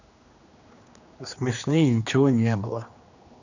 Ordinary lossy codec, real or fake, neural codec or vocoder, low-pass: none; fake; codec, 16 kHz, 2 kbps, X-Codec, HuBERT features, trained on general audio; 7.2 kHz